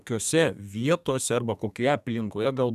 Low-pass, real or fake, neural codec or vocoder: 14.4 kHz; fake; codec, 32 kHz, 1.9 kbps, SNAC